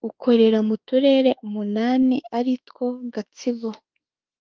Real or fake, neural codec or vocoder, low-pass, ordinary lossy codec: fake; autoencoder, 48 kHz, 32 numbers a frame, DAC-VAE, trained on Japanese speech; 7.2 kHz; Opus, 32 kbps